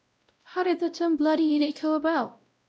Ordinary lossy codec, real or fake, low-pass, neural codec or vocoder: none; fake; none; codec, 16 kHz, 0.5 kbps, X-Codec, WavLM features, trained on Multilingual LibriSpeech